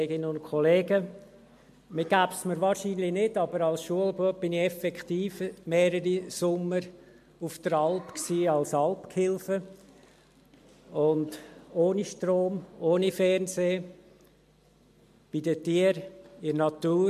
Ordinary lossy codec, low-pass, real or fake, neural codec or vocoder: MP3, 64 kbps; 14.4 kHz; real; none